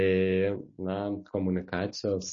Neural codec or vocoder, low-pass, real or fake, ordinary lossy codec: none; 7.2 kHz; real; MP3, 32 kbps